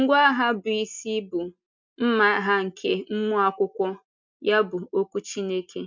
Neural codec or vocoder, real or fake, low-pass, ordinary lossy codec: none; real; 7.2 kHz; MP3, 64 kbps